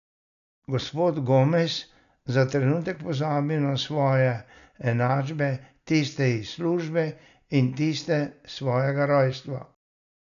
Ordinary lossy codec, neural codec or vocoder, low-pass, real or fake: none; none; 7.2 kHz; real